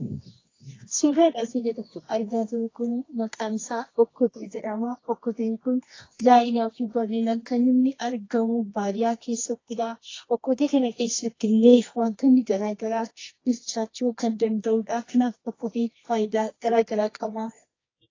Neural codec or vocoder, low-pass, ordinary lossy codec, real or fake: codec, 24 kHz, 0.9 kbps, WavTokenizer, medium music audio release; 7.2 kHz; AAC, 32 kbps; fake